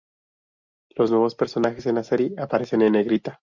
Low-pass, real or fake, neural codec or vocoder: 7.2 kHz; real; none